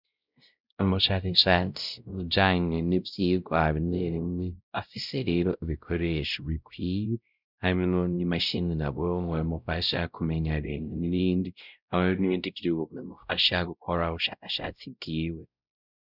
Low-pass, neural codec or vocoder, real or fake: 5.4 kHz; codec, 16 kHz, 0.5 kbps, X-Codec, WavLM features, trained on Multilingual LibriSpeech; fake